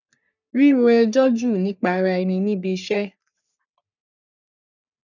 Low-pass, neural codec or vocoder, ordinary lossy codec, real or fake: 7.2 kHz; codec, 44.1 kHz, 3.4 kbps, Pupu-Codec; none; fake